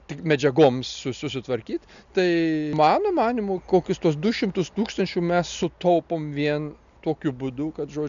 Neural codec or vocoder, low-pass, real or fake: none; 7.2 kHz; real